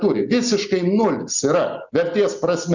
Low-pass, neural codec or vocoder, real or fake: 7.2 kHz; none; real